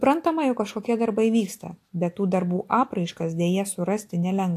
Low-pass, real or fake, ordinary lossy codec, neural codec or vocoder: 14.4 kHz; real; AAC, 64 kbps; none